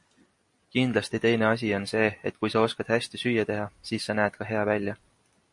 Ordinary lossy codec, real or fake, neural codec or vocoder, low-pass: MP3, 48 kbps; real; none; 10.8 kHz